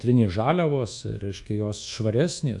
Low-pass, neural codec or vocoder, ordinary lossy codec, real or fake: 10.8 kHz; codec, 24 kHz, 1.2 kbps, DualCodec; AAC, 64 kbps; fake